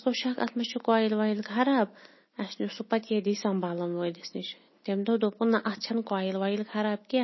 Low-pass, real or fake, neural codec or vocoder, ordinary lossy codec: 7.2 kHz; real; none; MP3, 24 kbps